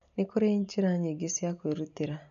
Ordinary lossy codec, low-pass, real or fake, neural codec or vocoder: none; 7.2 kHz; real; none